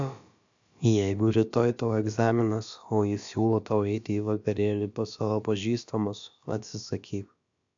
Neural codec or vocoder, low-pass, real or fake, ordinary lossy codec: codec, 16 kHz, about 1 kbps, DyCAST, with the encoder's durations; 7.2 kHz; fake; AAC, 64 kbps